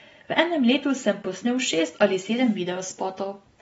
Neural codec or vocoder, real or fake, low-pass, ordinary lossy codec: none; real; 19.8 kHz; AAC, 24 kbps